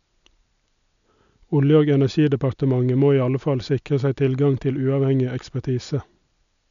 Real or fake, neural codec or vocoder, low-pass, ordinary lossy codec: real; none; 7.2 kHz; none